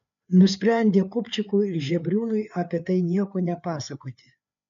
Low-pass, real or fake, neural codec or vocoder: 7.2 kHz; fake; codec, 16 kHz, 4 kbps, FreqCodec, larger model